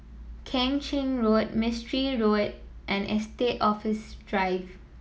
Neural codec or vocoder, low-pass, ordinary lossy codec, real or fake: none; none; none; real